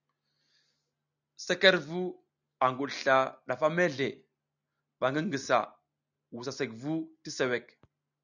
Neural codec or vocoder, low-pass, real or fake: none; 7.2 kHz; real